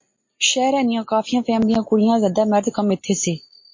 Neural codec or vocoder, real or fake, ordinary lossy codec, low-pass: none; real; MP3, 32 kbps; 7.2 kHz